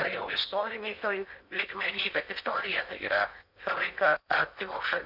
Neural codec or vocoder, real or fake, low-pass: codec, 16 kHz in and 24 kHz out, 0.8 kbps, FocalCodec, streaming, 65536 codes; fake; 5.4 kHz